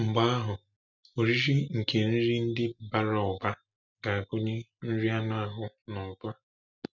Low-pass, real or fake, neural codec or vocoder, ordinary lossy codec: 7.2 kHz; real; none; none